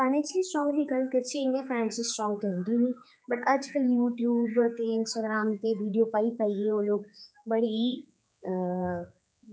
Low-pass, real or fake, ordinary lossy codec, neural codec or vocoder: none; fake; none; codec, 16 kHz, 4 kbps, X-Codec, HuBERT features, trained on general audio